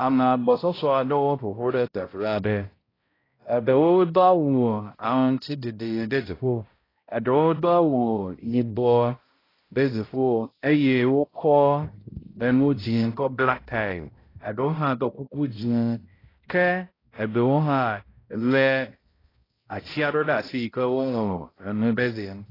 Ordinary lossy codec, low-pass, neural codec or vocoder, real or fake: AAC, 24 kbps; 5.4 kHz; codec, 16 kHz, 0.5 kbps, X-Codec, HuBERT features, trained on balanced general audio; fake